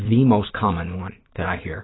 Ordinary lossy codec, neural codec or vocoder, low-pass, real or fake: AAC, 16 kbps; autoencoder, 48 kHz, 128 numbers a frame, DAC-VAE, trained on Japanese speech; 7.2 kHz; fake